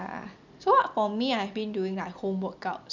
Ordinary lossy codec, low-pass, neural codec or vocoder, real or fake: none; 7.2 kHz; none; real